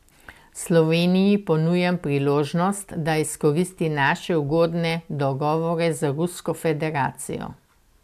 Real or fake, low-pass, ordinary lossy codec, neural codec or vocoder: real; 14.4 kHz; AAC, 96 kbps; none